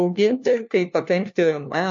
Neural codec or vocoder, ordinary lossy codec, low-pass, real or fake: codec, 16 kHz, 1 kbps, FunCodec, trained on LibriTTS, 50 frames a second; MP3, 48 kbps; 7.2 kHz; fake